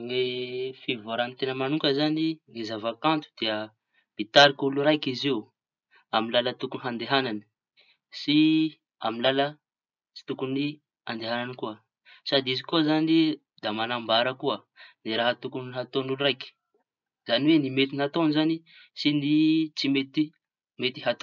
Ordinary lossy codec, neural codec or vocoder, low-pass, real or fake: none; none; 7.2 kHz; real